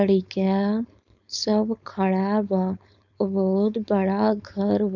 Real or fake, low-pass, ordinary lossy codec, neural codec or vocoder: fake; 7.2 kHz; none; codec, 16 kHz, 4.8 kbps, FACodec